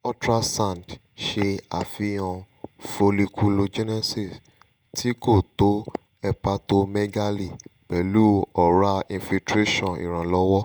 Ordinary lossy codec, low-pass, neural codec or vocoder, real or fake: none; none; vocoder, 48 kHz, 128 mel bands, Vocos; fake